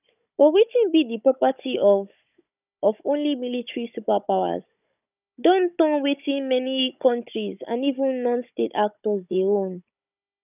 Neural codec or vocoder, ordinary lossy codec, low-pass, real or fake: codec, 16 kHz, 16 kbps, FunCodec, trained on Chinese and English, 50 frames a second; none; 3.6 kHz; fake